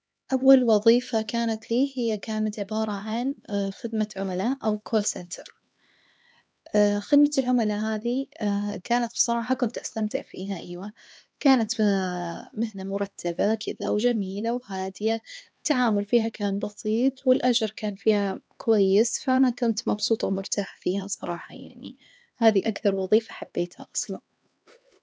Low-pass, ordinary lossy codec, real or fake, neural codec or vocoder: none; none; fake; codec, 16 kHz, 2 kbps, X-Codec, HuBERT features, trained on LibriSpeech